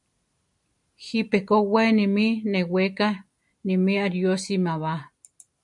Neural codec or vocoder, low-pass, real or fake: none; 10.8 kHz; real